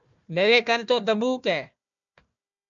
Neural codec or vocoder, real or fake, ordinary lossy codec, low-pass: codec, 16 kHz, 1 kbps, FunCodec, trained on Chinese and English, 50 frames a second; fake; MP3, 64 kbps; 7.2 kHz